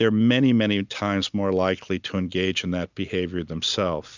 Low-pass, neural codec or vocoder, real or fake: 7.2 kHz; none; real